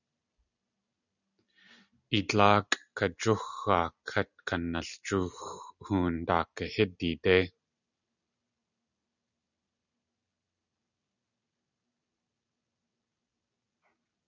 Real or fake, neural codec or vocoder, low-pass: real; none; 7.2 kHz